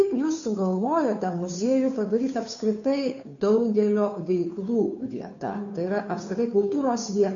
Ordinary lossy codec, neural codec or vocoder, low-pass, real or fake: MP3, 96 kbps; codec, 16 kHz, 2 kbps, FunCodec, trained on Chinese and English, 25 frames a second; 7.2 kHz; fake